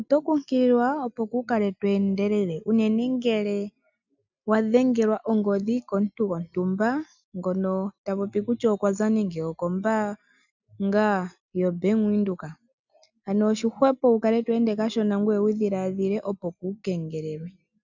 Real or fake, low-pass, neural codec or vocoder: real; 7.2 kHz; none